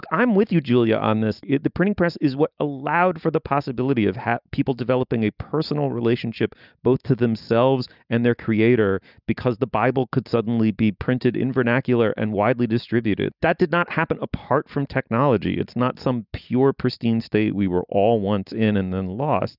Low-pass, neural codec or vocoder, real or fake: 5.4 kHz; none; real